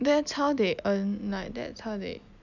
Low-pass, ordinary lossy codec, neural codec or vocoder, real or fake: 7.2 kHz; none; none; real